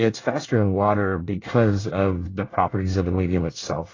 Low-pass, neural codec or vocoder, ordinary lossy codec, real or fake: 7.2 kHz; codec, 24 kHz, 1 kbps, SNAC; AAC, 32 kbps; fake